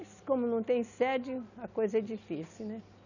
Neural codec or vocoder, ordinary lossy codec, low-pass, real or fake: none; none; 7.2 kHz; real